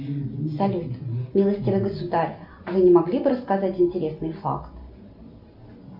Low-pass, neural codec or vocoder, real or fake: 5.4 kHz; none; real